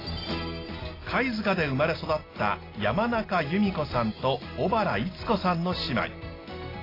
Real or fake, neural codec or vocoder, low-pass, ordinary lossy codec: real; none; 5.4 kHz; AAC, 24 kbps